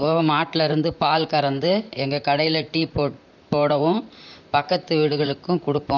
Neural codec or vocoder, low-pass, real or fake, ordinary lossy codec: vocoder, 44.1 kHz, 128 mel bands, Pupu-Vocoder; 7.2 kHz; fake; none